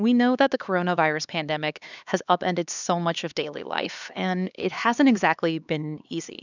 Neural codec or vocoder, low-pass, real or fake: codec, 16 kHz, 2 kbps, X-Codec, HuBERT features, trained on LibriSpeech; 7.2 kHz; fake